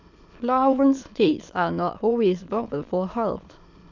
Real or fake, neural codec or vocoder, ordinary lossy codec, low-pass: fake; autoencoder, 22.05 kHz, a latent of 192 numbers a frame, VITS, trained on many speakers; none; 7.2 kHz